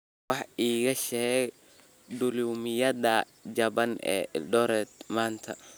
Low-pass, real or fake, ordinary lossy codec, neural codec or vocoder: none; real; none; none